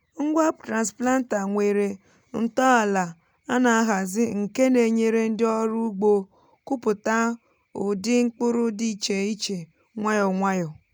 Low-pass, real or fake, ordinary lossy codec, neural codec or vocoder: none; real; none; none